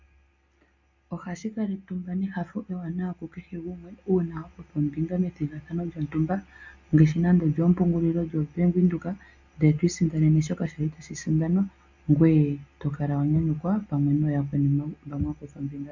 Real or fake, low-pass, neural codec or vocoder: real; 7.2 kHz; none